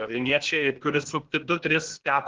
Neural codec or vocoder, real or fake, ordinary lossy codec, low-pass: codec, 16 kHz, 0.8 kbps, ZipCodec; fake; Opus, 16 kbps; 7.2 kHz